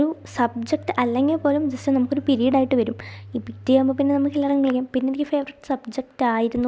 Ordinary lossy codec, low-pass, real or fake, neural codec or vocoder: none; none; real; none